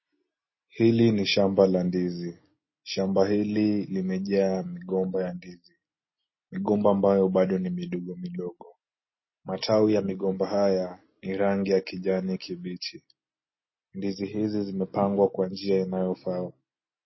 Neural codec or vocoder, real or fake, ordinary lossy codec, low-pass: none; real; MP3, 24 kbps; 7.2 kHz